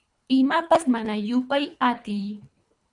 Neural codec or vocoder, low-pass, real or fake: codec, 24 kHz, 3 kbps, HILCodec; 10.8 kHz; fake